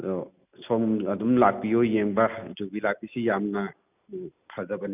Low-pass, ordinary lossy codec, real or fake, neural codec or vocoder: 3.6 kHz; none; real; none